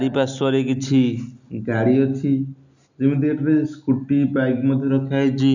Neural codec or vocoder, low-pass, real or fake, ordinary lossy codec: none; 7.2 kHz; real; none